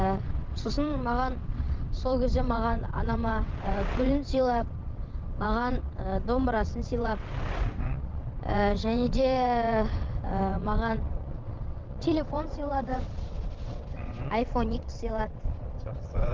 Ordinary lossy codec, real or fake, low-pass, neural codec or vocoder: Opus, 32 kbps; fake; 7.2 kHz; vocoder, 22.05 kHz, 80 mel bands, WaveNeXt